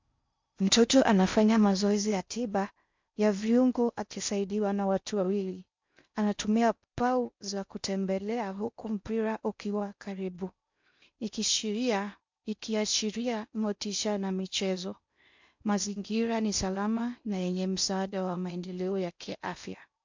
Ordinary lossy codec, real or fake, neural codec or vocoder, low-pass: MP3, 64 kbps; fake; codec, 16 kHz in and 24 kHz out, 0.6 kbps, FocalCodec, streaming, 4096 codes; 7.2 kHz